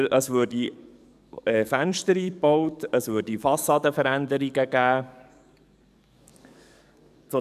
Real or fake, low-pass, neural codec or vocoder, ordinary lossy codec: fake; 14.4 kHz; codec, 44.1 kHz, 7.8 kbps, DAC; none